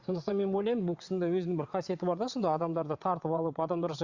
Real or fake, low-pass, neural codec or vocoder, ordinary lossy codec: fake; 7.2 kHz; vocoder, 22.05 kHz, 80 mel bands, WaveNeXt; Opus, 64 kbps